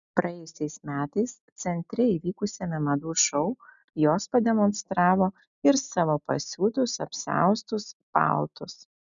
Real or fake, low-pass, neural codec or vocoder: real; 7.2 kHz; none